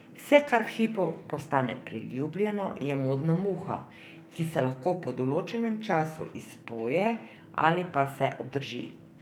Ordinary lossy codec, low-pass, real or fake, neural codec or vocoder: none; none; fake; codec, 44.1 kHz, 2.6 kbps, SNAC